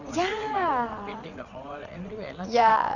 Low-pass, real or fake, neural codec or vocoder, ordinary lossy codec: 7.2 kHz; fake; vocoder, 22.05 kHz, 80 mel bands, WaveNeXt; none